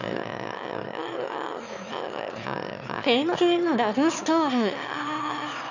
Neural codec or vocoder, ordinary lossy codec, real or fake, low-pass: autoencoder, 22.05 kHz, a latent of 192 numbers a frame, VITS, trained on one speaker; none; fake; 7.2 kHz